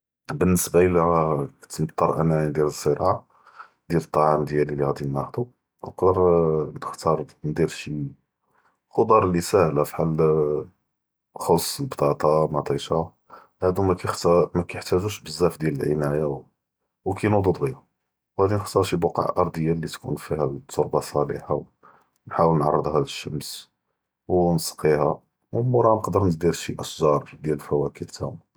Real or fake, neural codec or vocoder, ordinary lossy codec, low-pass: real; none; none; none